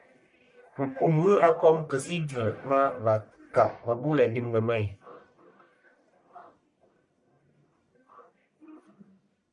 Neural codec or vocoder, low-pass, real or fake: codec, 44.1 kHz, 1.7 kbps, Pupu-Codec; 10.8 kHz; fake